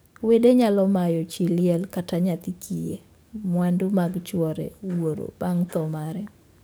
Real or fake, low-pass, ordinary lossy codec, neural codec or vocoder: fake; none; none; codec, 44.1 kHz, 7.8 kbps, DAC